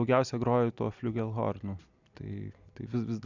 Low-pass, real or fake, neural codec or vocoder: 7.2 kHz; real; none